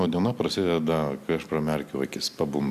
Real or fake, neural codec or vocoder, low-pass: real; none; 14.4 kHz